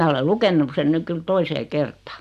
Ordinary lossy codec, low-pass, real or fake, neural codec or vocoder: MP3, 96 kbps; 14.4 kHz; real; none